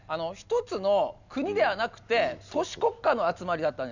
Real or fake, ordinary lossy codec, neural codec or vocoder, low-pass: real; none; none; 7.2 kHz